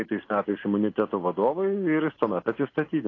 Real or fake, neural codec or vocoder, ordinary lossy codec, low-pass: real; none; AAC, 32 kbps; 7.2 kHz